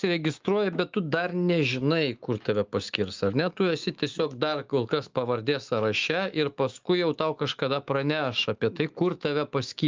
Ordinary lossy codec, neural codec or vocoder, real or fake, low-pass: Opus, 32 kbps; autoencoder, 48 kHz, 128 numbers a frame, DAC-VAE, trained on Japanese speech; fake; 7.2 kHz